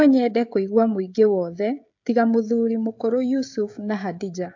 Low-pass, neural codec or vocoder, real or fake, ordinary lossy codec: 7.2 kHz; codec, 16 kHz, 16 kbps, FreqCodec, smaller model; fake; AAC, 48 kbps